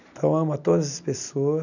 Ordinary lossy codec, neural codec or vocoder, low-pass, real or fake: none; none; 7.2 kHz; real